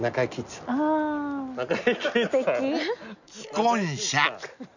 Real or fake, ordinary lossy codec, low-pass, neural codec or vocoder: real; none; 7.2 kHz; none